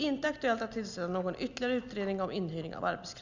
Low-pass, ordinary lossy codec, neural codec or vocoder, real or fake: 7.2 kHz; none; none; real